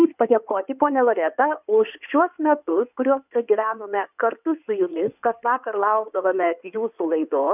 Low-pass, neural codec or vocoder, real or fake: 3.6 kHz; codec, 16 kHz in and 24 kHz out, 2.2 kbps, FireRedTTS-2 codec; fake